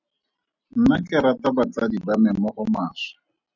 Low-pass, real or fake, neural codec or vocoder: 7.2 kHz; real; none